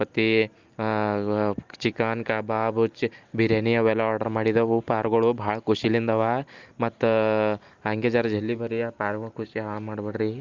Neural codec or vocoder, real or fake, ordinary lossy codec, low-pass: none; real; Opus, 32 kbps; 7.2 kHz